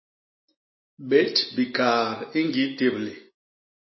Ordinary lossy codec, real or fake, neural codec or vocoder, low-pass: MP3, 24 kbps; real; none; 7.2 kHz